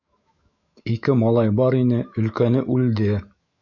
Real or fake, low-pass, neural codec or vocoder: fake; 7.2 kHz; autoencoder, 48 kHz, 128 numbers a frame, DAC-VAE, trained on Japanese speech